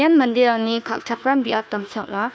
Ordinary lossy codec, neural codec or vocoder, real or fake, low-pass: none; codec, 16 kHz, 1 kbps, FunCodec, trained on Chinese and English, 50 frames a second; fake; none